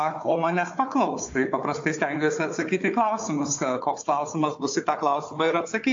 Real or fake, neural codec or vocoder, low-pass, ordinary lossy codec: fake; codec, 16 kHz, 4 kbps, FunCodec, trained on Chinese and English, 50 frames a second; 7.2 kHz; AAC, 48 kbps